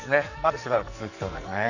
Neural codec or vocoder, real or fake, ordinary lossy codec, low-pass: codec, 44.1 kHz, 2.6 kbps, SNAC; fake; none; 7.2 kHz